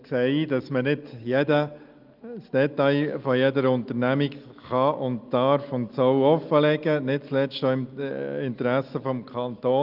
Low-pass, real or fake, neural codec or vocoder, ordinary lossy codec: 5.4 kHz; real; none; Opus, 32 kbps